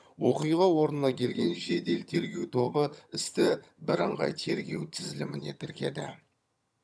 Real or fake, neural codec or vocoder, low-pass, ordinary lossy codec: fake; vocoder, 22.05 kHz, 80 mel bands, HiFi-GAN; none; none